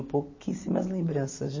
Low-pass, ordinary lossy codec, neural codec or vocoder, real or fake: 7.2 kHz; MP3, 32 kbps; none; real